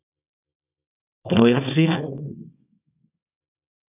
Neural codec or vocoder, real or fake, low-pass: codec, 24 kHz, 0.9 kbps, WavTokenizer, small release; fake; 3.6 kHz